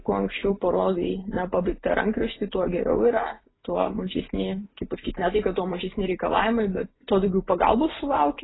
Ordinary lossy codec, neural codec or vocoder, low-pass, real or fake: AAC, 16 kbps; none; 7.2 kHz; real